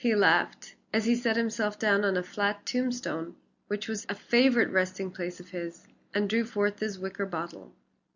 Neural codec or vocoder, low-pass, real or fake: none; 7.2 kHz; real